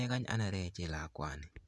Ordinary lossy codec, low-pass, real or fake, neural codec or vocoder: none; none; fake; vocoder, 24 kHz, 100 mel bands, Vocos